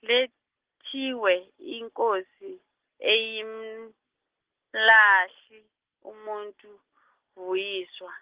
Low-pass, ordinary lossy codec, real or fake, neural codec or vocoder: 3.6 kHz; Opus, 16 kbps; real; none